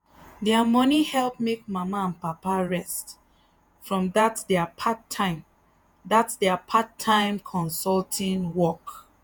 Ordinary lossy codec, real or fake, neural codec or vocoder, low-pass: none; fake; vocoder, 48 kHz, 128 mel bands, Vocos; none